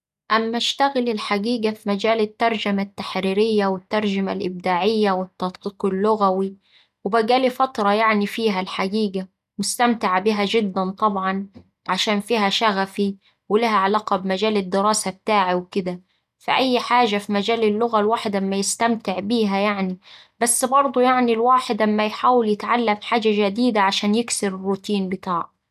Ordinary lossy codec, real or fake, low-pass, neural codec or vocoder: none; real; 14.4 kHz; none